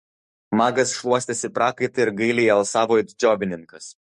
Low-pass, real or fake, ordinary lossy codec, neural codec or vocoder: 14.4 kHz; fake; MP3, 48 kbps; codec, 44.1 kHz, 7.8 kbps, DAC